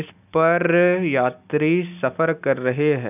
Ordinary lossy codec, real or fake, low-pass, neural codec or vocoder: none; real; 3.6 kHz; none